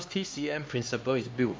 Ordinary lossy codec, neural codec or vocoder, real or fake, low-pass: none; codec, 16 kHz, 2 kbps, X-Codec, HuBERT features, trained on LibriSpeech; fake; none